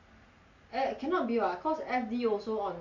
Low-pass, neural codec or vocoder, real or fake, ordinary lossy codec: 7.2 kHz; none; real; none